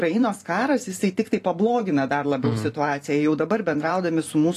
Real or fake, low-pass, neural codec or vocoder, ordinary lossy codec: fake; 14.4 kHz; vocoder, 44.1 kHz, 128 mel bands every 512 samples, BigVGAN v2; AAC, 48 kbps